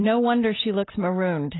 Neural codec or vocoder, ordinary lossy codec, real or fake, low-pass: none; AAC, 16 kbps; real; 7.2 kHz